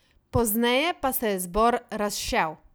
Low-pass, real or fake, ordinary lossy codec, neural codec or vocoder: none; real; none; none